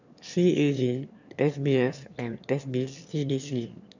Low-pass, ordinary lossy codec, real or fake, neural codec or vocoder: 7.2 kHz; none; fake; autoencoder, 22.05 kHz, a latent of 192 numbers a frame, VITS, trained on one speaker